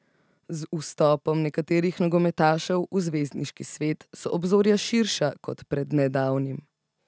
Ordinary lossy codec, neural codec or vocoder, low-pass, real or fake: none; none; none; real